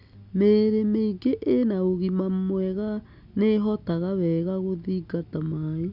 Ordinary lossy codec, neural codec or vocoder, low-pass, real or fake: none; none; 5.4 kHz; real